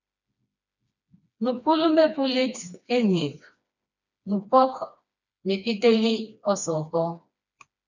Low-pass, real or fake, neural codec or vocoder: 7.2 kHz; fake; codec, 16 kHz, 2 kbps, FreqCodec, smaller model